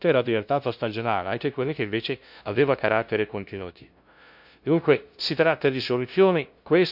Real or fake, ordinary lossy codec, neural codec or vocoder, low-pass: fake; none; codec, 16 kHz, 0.5 kbps, FunCodec, trained on LibriTTS, 25 frames a second; 5.4 kHz